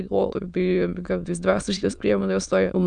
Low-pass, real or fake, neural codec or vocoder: 9.9 kHz; fake; autoencoder, 22.05 kHz, a latent of 192 numbers a frame, VITS, trained on many speakers